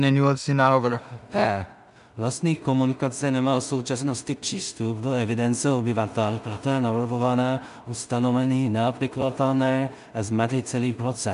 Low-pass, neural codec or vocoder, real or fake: 10.8 kHz; codec, 16 kHz in and 24 kHz out, 0.4 kbps, LongCat-Audio-Codec, two codebook decoder; fake